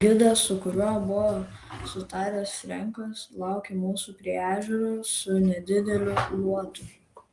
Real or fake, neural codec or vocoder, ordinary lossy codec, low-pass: real; none; Opus, 24 kbps; 10.8 kHz